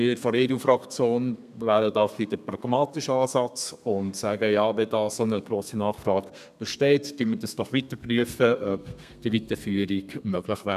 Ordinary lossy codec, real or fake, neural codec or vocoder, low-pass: none; fake; codec, 32 kHz, 1.9 kbps, SNAC; 14.4 kHz